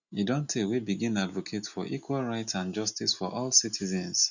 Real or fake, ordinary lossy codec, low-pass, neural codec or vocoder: real; none; 7.2 kHz; none